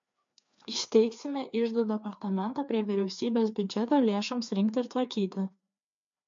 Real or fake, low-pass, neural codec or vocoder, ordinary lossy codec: fake; 7.2 kHz; codec, 16 kHz, 2 kbps, FreqCodec, larger model; MP3, 48 kbps